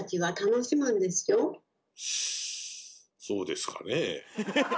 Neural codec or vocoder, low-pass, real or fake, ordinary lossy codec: none; none; real; none